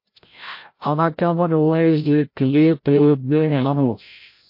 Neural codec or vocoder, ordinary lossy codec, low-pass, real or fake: codec, 16 kHz, 0.5 kbps, FreqCodec, larger model; MP3, 32 kbps; 5.4 kHz; fake